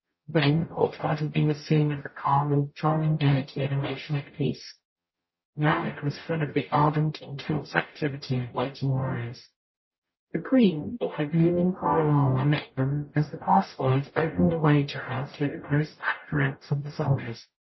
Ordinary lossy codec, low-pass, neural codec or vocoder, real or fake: MP3, 24 kbps; 7.2 kHz; codec, 44.1 kHz, 0.9 kbps, DAC; fake